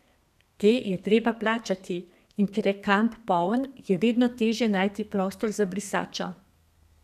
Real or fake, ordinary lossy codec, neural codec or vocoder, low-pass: fake; none; codec, 32 kHz, 1.9 kbps, SNAC; 14.4 kHz